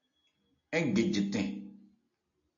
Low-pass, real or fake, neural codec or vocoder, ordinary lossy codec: 7.2 kHz; real; none; AAC, 48 kbps